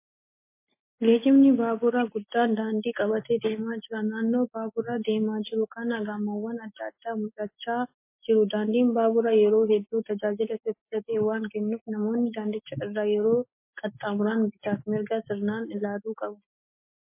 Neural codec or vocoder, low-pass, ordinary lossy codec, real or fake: none; 3.6 kHz; MP3, 24 kbps; real